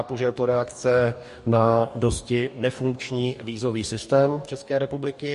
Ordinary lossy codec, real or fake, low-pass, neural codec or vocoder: MP3, 48 kbps; fake; 14.4 kHz; codec, 44.1 kHz, 2.6 kbps, DAC